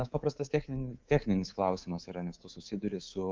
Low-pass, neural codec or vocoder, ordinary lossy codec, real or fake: 7.2 kHz; codec, 24 kHz, 3.1 kbps, DualCodec; Opus, 24 kbps; fake